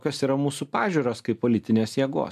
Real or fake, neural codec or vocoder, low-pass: real; none; 14.4 kHz